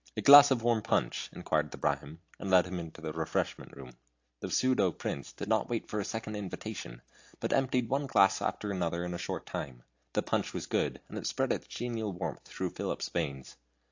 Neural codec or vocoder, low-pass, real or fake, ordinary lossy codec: none; 7.2 kHz; real; AAC, 48 kbps